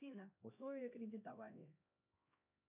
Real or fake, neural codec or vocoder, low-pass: fake; codec, 16 kHz, 1 kbps, X-Codec, HuBERT features, trained on LibriSpeech; 3.6 kHz